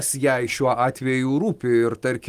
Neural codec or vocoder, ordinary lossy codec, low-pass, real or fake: none; Opus, 24 kbps; 14.4 kHz; real